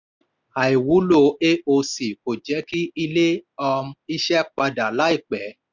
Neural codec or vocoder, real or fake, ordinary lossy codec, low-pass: none; real; none; 7.2 kHz